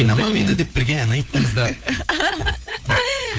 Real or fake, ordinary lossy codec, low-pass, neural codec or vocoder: fake; none; none; codec, 16 kHz, 4 kbps, FunCodec, trained on LibriTTS, 50 frames a second